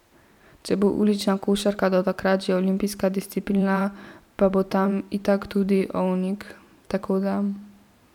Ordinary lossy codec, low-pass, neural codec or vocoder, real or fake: none; 19.8 kHz; vocoder, 44.1 kHz, 128 mel bands every 512 samples, BigVGAN v2; fake